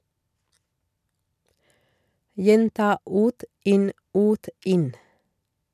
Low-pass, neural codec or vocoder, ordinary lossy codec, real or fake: 14.4 kHz; none; none; real